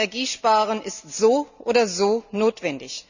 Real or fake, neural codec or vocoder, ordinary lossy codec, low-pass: real; none; none; 7.2 kHz